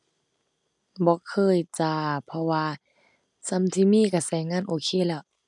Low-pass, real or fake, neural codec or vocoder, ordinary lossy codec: 10.8 kHz; real; none; none